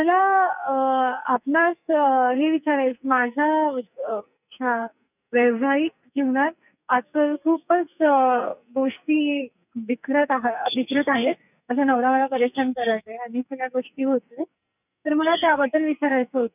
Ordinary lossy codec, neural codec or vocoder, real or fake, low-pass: none; codec, 44.1 kHz, 2.6 kbps, SNAC; fake; 3.6 kHz